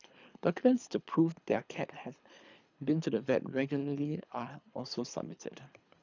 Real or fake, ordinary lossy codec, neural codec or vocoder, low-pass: fake; none; codec, 24 kHz, 3 kbps, HILCodec; 7.2 kHz